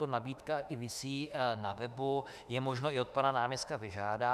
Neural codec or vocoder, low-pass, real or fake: autoencoder, 48 kHz, 32 numbers a frame, DAC-VAE, trained on Japanese speech; 14.4 kHz; fake